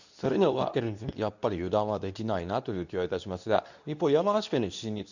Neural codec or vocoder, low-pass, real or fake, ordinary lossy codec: codec, 24 kHz, 0.9 kbps, WavTokenizer, medium speech release version 2; 7.2 kHz; fake; none